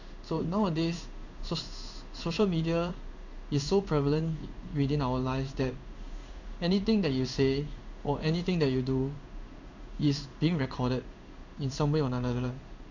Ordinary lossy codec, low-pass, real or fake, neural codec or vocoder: none; 7.2 kHz; fake; codec, 16 kHz in and 24 kHz out, 1 kbps, XY-Tokenizer